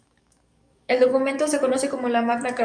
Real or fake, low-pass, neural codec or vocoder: fake; 9.9 kHz; codec, 44.1 kHz, 7.8 kbps, DAC